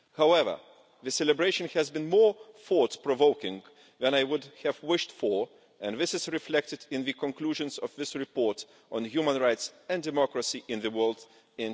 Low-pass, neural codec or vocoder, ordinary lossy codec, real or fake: none; none; none; real